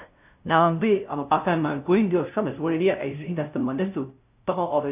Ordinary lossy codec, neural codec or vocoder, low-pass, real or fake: none; codec, 16 kHz, 0.5 kbps, FunCodec, trained on LibriTTS, 25 frames a second; 3.6 kHz; fake